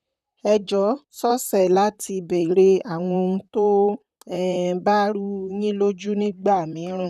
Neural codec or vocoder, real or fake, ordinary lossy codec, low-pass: vocoder, 44.1 kHz, 128 mel bands, Pupu-Vocoder; fake; none; 14.4 kHz